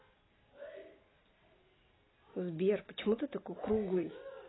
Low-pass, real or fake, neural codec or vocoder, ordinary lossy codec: 7.2 kHz; real; none; AAC, 16 kbps